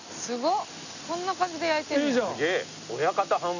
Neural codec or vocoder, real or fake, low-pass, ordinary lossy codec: none; real; 7.2 kHz; none